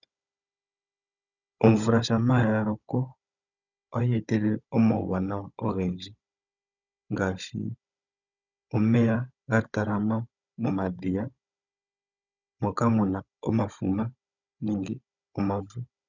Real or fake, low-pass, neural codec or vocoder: fake; 7.2 kHz; codec, 16 kHz, 16 kbps, FunCodec, trained on Chinese and English, 50 frames a second